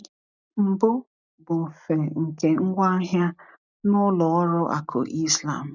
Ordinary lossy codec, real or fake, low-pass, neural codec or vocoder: none; real; 7.2 kHz; none